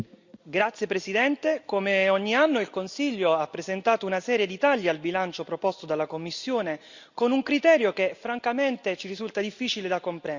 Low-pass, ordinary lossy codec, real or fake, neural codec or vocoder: 7.2 kHz; Opus, 64 kbps; real; none